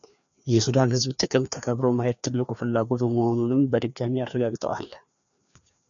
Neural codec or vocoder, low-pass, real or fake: codec, 16 kHz, 2 kbps, FreqCodec, larger model; 7.2 kHz; fake